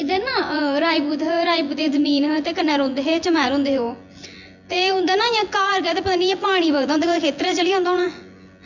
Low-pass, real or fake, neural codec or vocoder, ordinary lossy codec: 7.2 kHz; fake; vocoder, 24 kHz, 100 mel bands, Vocos; none